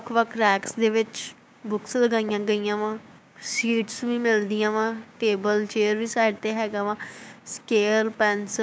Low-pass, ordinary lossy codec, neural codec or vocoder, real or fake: none; none; codec, 16 kHz, 6 kbps, DAC; fake